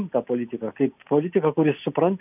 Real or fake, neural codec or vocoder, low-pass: real; none; 3.6 kHz